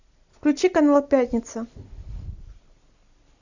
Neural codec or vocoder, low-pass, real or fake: none; 7.2 kHz; real